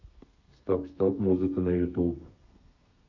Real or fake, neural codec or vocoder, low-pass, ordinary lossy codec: fake; codec, 32 kHz, 1.9 kbps, SNAC; 7.2 kHz; none